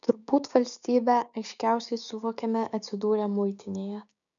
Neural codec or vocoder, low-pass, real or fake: codec, 16 kHz, 6 kbps, DAC; 7.2 kHz; fake